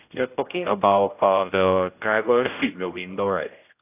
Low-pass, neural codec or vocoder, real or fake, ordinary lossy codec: 3.6 kHz; codec, 16 kHz, 0.5 kbps, X-Codec, HuBERT features, trained on general audio; fake; AAC, 32 kbps